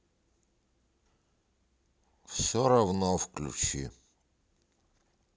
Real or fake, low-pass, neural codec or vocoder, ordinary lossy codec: real; none; none; none